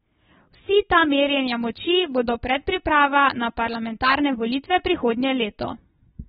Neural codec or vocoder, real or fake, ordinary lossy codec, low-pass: none; real; AAC, 16 kbps; 19.8 kHz